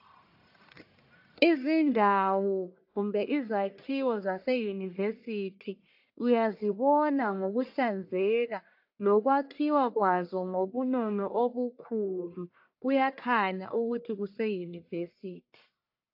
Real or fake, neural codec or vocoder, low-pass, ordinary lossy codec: fake; codec, 44.1 kHz, 1.7 kbps, Pupu-Codec; 5.4 kHz; AAC, 48 kbps